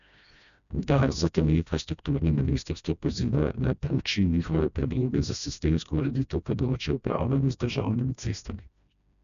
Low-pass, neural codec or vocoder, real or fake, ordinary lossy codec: 7.2 kHz; codec, 16 kHz, 1 kbps, FreqCodec, smaller model; fake; none